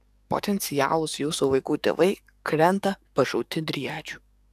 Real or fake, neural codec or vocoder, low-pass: fake; codec, 44.1 kHz, 7.8 kbps, DAC; 14.4 kHz